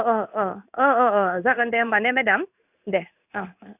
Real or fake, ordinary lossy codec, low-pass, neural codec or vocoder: fake; none; 3.6 kHz; codec, 16 kHz in and 24 kHz out, 1 kbps, XY-Tokenizer